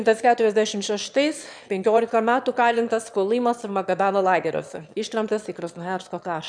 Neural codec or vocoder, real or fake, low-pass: autoencoder, 22.05 kHz, a latent of 192 numbers a frame, VITS, trained on one speaker; fake; 9.9 kHz